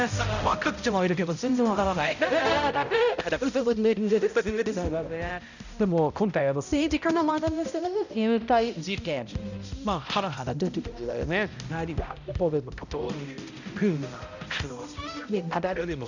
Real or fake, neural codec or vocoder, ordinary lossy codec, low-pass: fake; codec, 16 kHz, 0.5 kbps, X-Codec, HuBERT features, trained on balanced general audio; none; 7.2 kHz